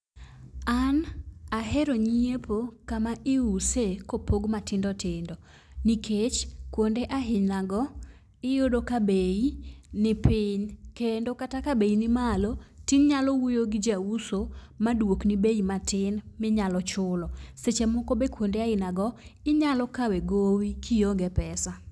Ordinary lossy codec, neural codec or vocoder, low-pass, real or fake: none; none; none; real